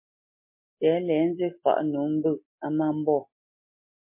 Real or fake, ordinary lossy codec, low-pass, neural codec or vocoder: real; MP3, 24 kbps; 3.6 kHz; none